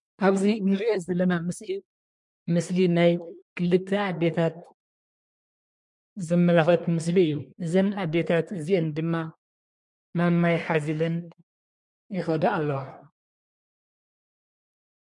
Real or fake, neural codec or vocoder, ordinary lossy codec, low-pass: fake; codec, 24 kHz, 1 kbps, SNAC; MP3, 64 kbps; 10.8 kHz